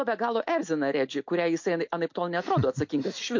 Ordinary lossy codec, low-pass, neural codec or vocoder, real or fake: MP3, 48 kbps; 7.2 kHz; none; real